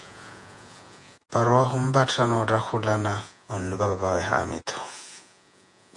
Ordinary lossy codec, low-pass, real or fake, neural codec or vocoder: AAC, 64 kbps; 10.8 kHz; fake; vocoder, 48 kHz, 128 mel bands, Vocos